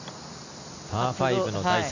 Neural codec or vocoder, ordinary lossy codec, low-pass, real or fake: none; none; 7.2 kHz; real